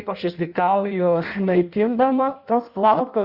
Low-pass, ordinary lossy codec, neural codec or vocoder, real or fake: 5.4 kHz; Opus, 64 kbps; codec, 16 kHz in and 24 kHz out, 0.6 kbps, FireRedTTS-2 codec; fake